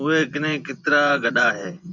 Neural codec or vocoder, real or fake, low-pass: vocoder, 24 kHz, 100 mel bands, Vocos; fake; 7.2 kHz